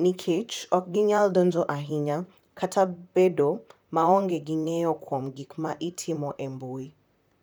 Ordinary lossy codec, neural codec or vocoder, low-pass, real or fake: none; vocoder, 44.1 kHz, 128 mel bands, Pupu-Vocoder; none; fake